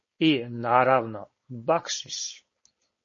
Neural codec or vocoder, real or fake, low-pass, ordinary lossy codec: codec, 16 kHz, 4.8 kbps, FACodec; fake; 7.2 kHz; MP3, 32 kbps